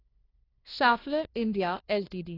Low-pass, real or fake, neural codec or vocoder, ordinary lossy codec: 5.4 kHz; fake; codec, 16 kHz, 1 kbps, FunCodec, trained on LibriTTS, 50 frames a second; AAC, 24 kbps